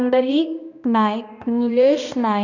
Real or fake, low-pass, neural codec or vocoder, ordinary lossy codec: fake; 7.2 kHz; codec, 16 kHz, 1 kbps, X-Codec, HuBERT features, trained on balanced general audio; none